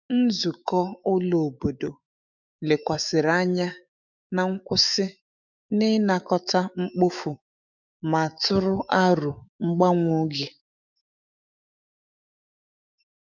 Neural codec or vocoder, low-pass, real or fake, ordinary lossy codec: autoencoder, 48 kHz, 128 numbers a frame, DAC-VAE, trained on Japanese speech; 7.2 kHz; fake; none